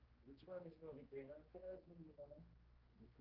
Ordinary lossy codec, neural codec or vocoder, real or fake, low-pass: Opus, 16 kbps; codec, 16 kHz, 2 kbps, X-Codec, HuBERT features, trained on general audio; fake; 5.4 kHz